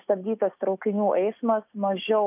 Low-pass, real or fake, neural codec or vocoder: 3.6 kHz; real; none